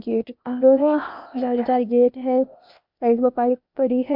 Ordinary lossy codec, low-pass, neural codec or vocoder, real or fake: MP3, 48 kbps; 5.4 kHz; codec, 16 kHz, 0.8 kbps, ZipCodec; fake